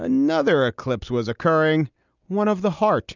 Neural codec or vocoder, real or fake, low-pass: none; real; 7.2 kHz